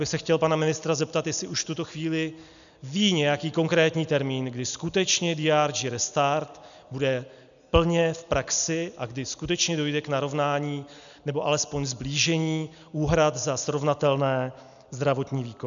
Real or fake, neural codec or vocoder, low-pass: real; none; 7.2 kHz